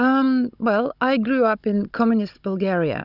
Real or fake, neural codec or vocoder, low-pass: fake; codec, 16 kHz, 16 kbps, FunCodec, trained on Chinese and English, 50 frames a second; 5.4 kHz